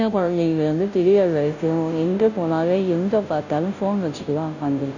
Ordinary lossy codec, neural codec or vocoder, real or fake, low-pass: none; codec, 16 kHz, 0.5 kbps, FunCodec, trained on Chinese and English, 25 frames a second; fake; 7.2 kHz